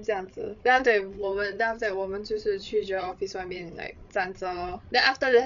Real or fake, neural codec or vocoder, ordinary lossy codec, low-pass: fake; codec, 16 kHz, 8 kbps, FreqCodec, larger model; none; 7.2 kHz